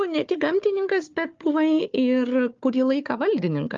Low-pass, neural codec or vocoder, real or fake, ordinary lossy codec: 7.2 kHz; codec, 16 kHz, 4 kbps, FunCodec, trained on Chinese and English, 50 frames a second; fake; Opus, 24 kbps